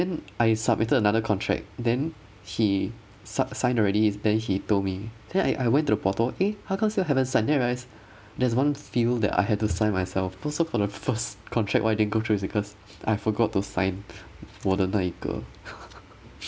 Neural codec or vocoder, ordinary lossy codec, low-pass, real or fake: none; none; none; real